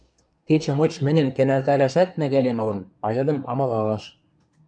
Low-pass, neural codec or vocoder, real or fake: 9.9 kHz; codec, 24 kHz, 1 kbps, SNAC; fake